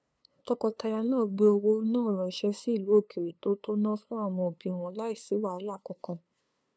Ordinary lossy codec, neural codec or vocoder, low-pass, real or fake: none; codec, 16 kHz, 2 kbps, FunCodec, trained on LibriTTS, 25 frames a second; none; fake